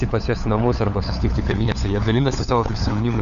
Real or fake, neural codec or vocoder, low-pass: fake; codec, 16 kHz, 4 kbps, FunCodec, trained on LibriTTS, 50 frames a second; 7.2 kHz